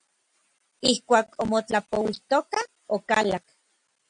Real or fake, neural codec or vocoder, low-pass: real; none; 9.9 kHz